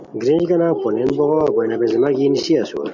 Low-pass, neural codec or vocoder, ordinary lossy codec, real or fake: 7.2 kHz; none; MP3, 48 kbps; real